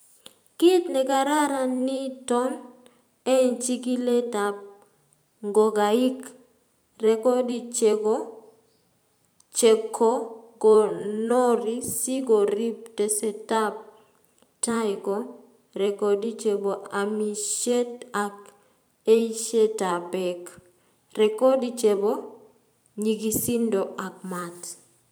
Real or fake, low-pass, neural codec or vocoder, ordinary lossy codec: fake; none; vocoder, 44.1 kHz, 128 mel bands every 512 samples, BigVGAN v2; none